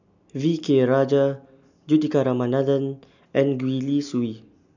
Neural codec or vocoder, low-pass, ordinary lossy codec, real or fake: none; 7.2 kHz; none; real